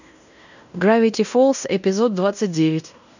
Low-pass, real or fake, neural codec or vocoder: 7.2 kHz; fake; codec, 16 kHz in and 24 kHz out, 0.9 kbps, LongCat-Audio-Codec, fine tuned four codebook decoder